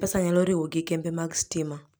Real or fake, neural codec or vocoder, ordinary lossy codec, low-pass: real; none; none; none